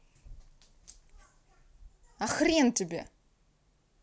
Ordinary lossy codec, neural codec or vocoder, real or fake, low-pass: none; none; real; none